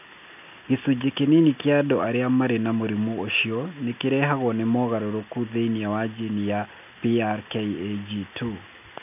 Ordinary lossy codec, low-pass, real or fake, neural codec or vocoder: none; 3.6 kHz; real; none